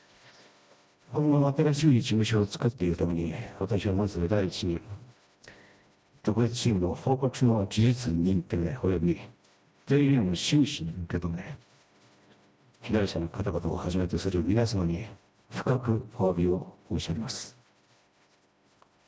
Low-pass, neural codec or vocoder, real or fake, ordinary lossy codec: none; codec, 16 kHz, 1 kbps, FreqCodec, smaller model; fake; none